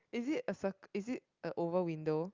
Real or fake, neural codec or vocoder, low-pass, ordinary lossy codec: real; none; 7.2 kHz; Opus, 24 kbps